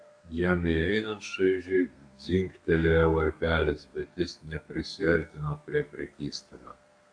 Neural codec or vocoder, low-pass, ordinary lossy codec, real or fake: codec, 32 kHz, 1.9 kbps, SNAC; 9.9 kHz; AAC, 64 kbps; fake